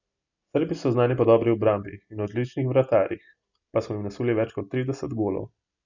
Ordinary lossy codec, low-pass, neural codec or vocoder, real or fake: none; 7.2 kHz; none; real